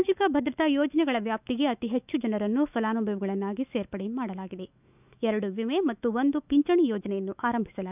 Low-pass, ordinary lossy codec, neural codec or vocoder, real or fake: 3.6 kHz; none; autoencoder, 48 kHz, 128 numbers a frame, DAC-VAE, trained on Japanese speech; fake